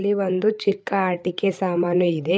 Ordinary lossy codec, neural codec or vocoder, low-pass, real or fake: none; codec, 16 kHz, 8 kbps, FreqCodec, larger model; none; fake